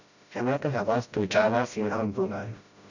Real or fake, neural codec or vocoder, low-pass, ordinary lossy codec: fake; codec, 16 kHz, 0.5 kbps, FreqCodec, smaller model; 7.2 kHz; none